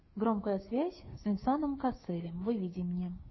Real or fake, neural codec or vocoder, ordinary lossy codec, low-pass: fake; codec, 16 kHz, 6 kbps, DAC; MP3, 24 kbps; 7.2 kHz